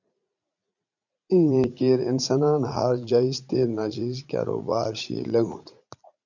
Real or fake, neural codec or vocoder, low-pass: fake; vocoder, 44.1 kHz, 80 mel bands, Vocos; 7.2 kHz